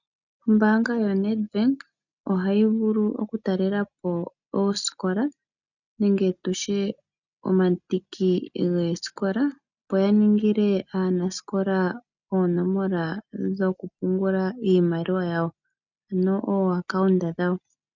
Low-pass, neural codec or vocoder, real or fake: 7.2 kHz; none; real